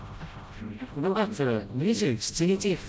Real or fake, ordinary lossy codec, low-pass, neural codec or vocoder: fake; none; none; codec, 16 kHz, 0.5 kbps, FreqCodec, smaller model